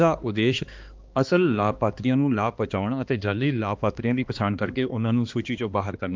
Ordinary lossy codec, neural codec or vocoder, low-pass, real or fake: Opus, 24 kbps; codec, 16 kHz, 2 kbps, X-Codec, HuBERT features, trained on balanced general audio; 7.2 kHz; fake